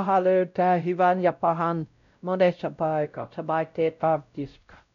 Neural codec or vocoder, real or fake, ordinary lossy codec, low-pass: codec, 16 kHz, 0.5 kbps, X-Codec, WavLM features, trained on Multilingual LibriSpeech; fake; none; 7.2 kHz